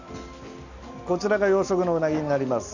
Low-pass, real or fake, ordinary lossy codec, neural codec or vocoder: 7.2 kHz; real; none; none